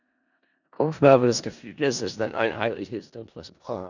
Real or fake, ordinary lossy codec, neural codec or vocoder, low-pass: fake; none; codec, 16 kHz in and 24 kHz out, 0.4 kbps, LongCat-Audio-Codec, four codebook decoder; 7.2 kHz